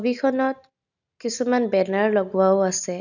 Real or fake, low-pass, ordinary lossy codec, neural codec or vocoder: real; 7.2 kHz; none; none